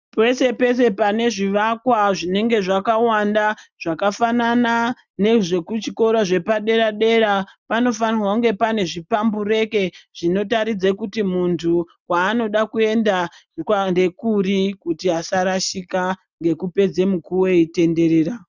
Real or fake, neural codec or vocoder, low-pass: real; none; 7.2 kHz